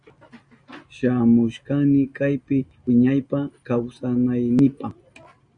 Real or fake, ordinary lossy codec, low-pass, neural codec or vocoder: real; Opus, 64 kbps; 9.9 kHz; none